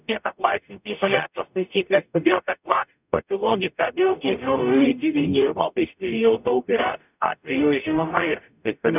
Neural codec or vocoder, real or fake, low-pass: codec, 44.1 kHz, 0.9 kbps, DAC; fake; 3.6 kHz